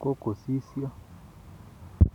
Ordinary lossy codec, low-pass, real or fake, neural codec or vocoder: none; 19.8 kHz; real; none